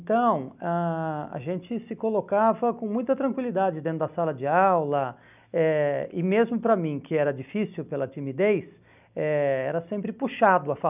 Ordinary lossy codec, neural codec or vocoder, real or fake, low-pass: none; none; real; 3.6 kHz